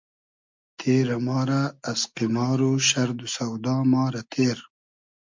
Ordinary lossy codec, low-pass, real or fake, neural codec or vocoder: MP3, 64 kbps; 7.2 kHz; real; none